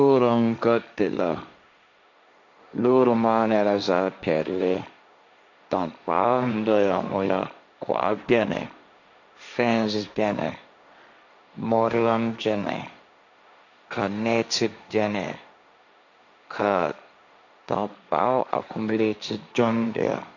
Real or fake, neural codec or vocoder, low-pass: fake; codec, 16 kHz, 1.1 kbps, Voila-Tokenizer; 7.2 kHz